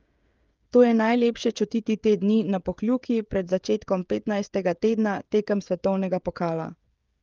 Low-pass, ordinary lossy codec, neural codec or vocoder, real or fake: 7.2 kHz; Opus, 32 kbps; codec, 16 kHz, 16 kbps, FreqCodec, smaller model; fake